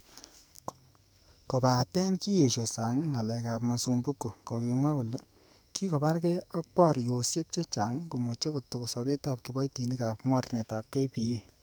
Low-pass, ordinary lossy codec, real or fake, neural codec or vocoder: none; none; fake; codec, 44.1 kHz, 2.6 kbps, SNAC